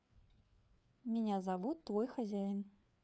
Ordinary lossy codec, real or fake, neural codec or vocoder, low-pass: none; fake; codec, 16 kHz, 4 kbps, FreqCodec, larger model; none